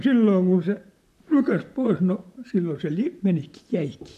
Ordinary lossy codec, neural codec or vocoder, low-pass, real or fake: MP3, 96 kbps; vocoder, 44.1 kHz, 128 mel bands, Pupu-Vocoder; 14.4 kHz; fake